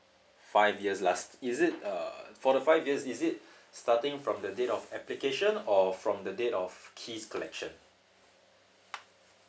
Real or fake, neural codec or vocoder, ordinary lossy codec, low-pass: real; none; none; none